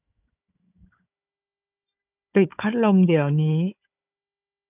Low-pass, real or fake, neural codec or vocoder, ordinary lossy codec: 3.6 kHz; fake; codec, 16 kHz, 4 kbps, FunCodec, trained on Chinese and English, 50 frames a second; none